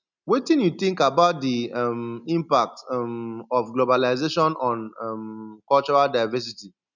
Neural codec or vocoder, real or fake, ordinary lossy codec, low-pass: none; real; none; 7.2 kHz